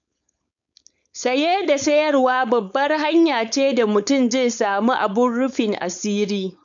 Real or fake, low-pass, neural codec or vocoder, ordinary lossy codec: fake; 7.2 kHz; codec, 16 kHz, 4.8 kbps, FACodec; MP3, 96 kbps